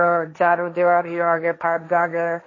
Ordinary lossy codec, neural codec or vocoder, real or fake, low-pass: MP3, 32 kbps; codec, 16 kHz, 1.1 kbps, Voila-Tokenizer; fake; 7.2 kHz